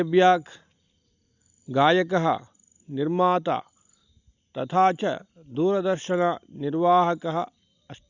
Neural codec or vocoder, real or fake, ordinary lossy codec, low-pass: none; real; Opus, 64 kbps; 7.2 kHz